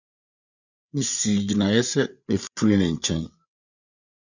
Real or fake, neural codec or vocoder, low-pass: fake; codec, 16 kHz, 8 kbps, FreqCodec, larger model; 7.2 kHz